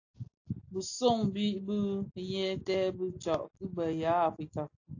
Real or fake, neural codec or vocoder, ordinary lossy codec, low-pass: real; none; AAC, 48 kbps; 7.2 kHz